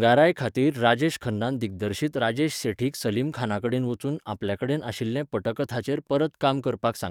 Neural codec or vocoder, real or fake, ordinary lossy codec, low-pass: codec, 44.1 kHz, 7.8 kbps, DAC; fake; none; 19.8 kHz